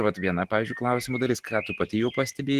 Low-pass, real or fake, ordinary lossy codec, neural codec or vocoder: 14.4 kHz; real; Opus, 16 kbps; none